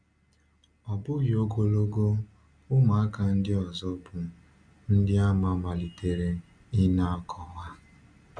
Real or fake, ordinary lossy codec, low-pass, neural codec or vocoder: real; none; 9.9 kHz; none